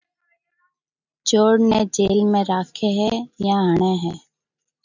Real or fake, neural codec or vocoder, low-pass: real; none; 7.2 kHz